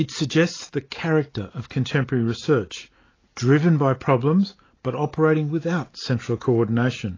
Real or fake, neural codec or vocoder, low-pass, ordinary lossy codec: real; none; 7.2 kHz; AAC, 32 kbps